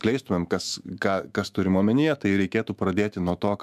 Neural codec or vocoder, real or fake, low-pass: autoencoder, 48 kHz, 128 numbers a frame, DAC-VAE, trained on Japanese speech; fake; 14.4 kHz